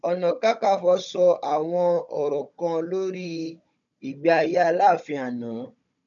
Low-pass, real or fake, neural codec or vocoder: 7.2 kHz; fake; codec, 16 kHz, 16 kbps, FunCodec, trained on Chinese and English, 50 frames a second